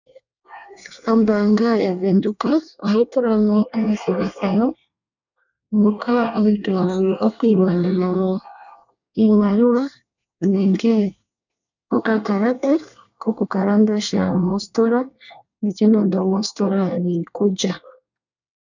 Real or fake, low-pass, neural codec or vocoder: fake; 7.2 kHz; codec, 24 kHz, 1 kbps, SNAC